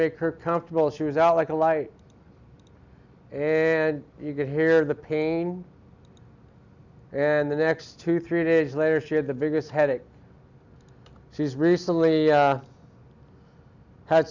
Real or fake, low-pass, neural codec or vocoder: real; 7.2 kHz; none